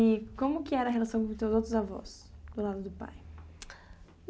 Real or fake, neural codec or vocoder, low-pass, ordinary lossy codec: real; none; none; none